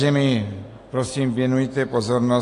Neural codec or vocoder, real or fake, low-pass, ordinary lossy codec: none; real; 10.8 kHz; AAC, 48 kbps